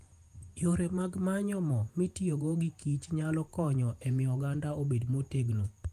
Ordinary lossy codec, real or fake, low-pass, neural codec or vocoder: Opus, 32 kbps; fake; 14.4 kHz; vocoder, 48 kHz, 128 mel bands, Vocos